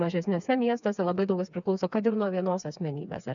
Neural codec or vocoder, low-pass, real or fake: codec, 16 kHz, 4 kbps, FreqCodec, smaller model; 7.2 kHz; fake